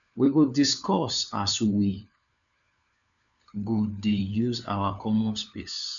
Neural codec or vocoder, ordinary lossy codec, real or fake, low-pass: codec, 16 kHz, 4 kbps, FunCodec, trained on LibriTTS, 50 frames a second; none; fake; 7.2 kHz